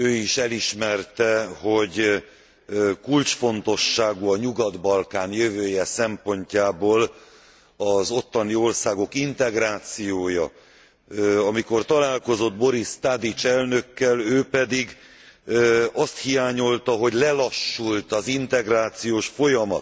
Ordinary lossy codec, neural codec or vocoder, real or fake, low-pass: none; none; real; none